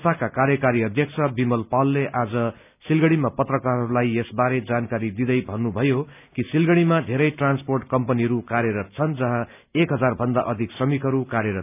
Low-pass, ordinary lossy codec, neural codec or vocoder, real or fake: 3.6 kHz; none; none; real